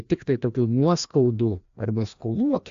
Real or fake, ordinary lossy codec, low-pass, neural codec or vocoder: fake; AAC, 64 kbps; 7.2 kHz; codec, 16 kHz, 1 kbps, FreqCodec, larger model